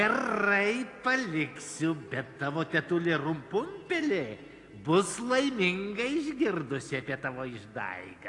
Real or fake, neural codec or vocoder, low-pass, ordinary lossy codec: real; none; 10.8 kHz; AAC, 48 kbps